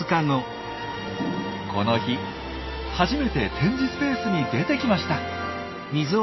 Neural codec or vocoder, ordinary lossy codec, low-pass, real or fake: none; MP3, 24 kbps; 7.2 kHz; real